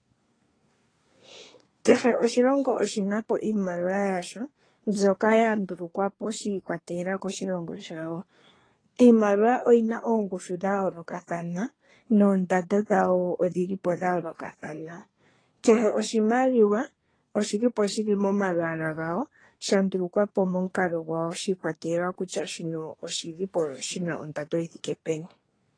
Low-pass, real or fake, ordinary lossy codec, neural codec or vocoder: 9.9 kHz; fake; AAC, 32 kbps; codec, 24 kHz, 1 kbps, SNAC